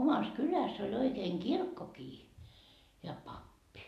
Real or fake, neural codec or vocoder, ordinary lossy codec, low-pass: real; none; MP3, 96 kbps; 14.4 kHz